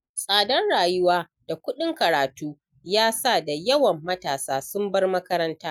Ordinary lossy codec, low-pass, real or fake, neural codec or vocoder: none; 19.8 kHz; real; none